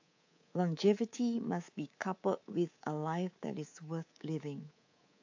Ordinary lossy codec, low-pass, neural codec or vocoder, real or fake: AAC, 48 kbps; 7.2 kHz; codec, 24 kHz, 3.1 kbps, DualCodec; fake